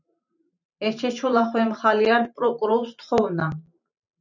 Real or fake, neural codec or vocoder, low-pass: fake; vocoder, 44.1 kHz, 128 mel bands every 512 samples, BigVGAN v2; 7.2 kHz